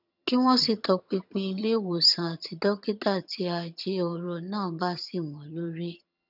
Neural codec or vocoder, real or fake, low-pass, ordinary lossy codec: vocoder, 22.05 kHz, 80 mel bands, HiFi-GAN; fake; 5.4 kHz; none